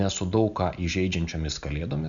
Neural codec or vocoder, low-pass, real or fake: none; 7.2 kHz; real